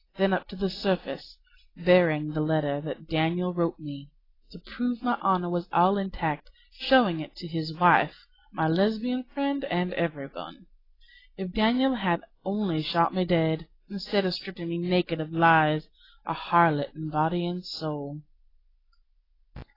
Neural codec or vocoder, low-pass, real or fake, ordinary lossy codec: none; 5.4 kHz; real; AAC, 24 kbps